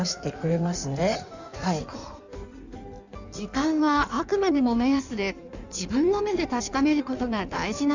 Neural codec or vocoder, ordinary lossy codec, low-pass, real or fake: codec, 16 kHz in and 24 kHz out, 1.1 kbps, FireRedTTS-2 codec; none; 7.2 kHz; fake